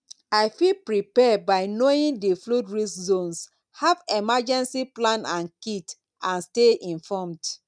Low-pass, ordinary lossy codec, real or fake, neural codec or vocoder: 9.9 kHz; none; real; none